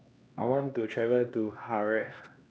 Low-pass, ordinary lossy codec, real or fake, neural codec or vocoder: none; none; fake; codec, 16 kHz, 2 kbps, X-Codec, HuBERT features, trained on LibriSpeech